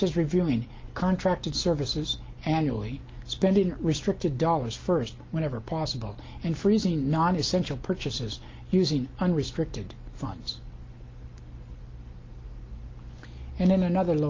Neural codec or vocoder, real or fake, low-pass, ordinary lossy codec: none; real; 7.2 kHz; Opus, 24 kbps